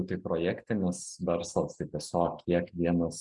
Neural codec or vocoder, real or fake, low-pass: none; real; 10.8 kHz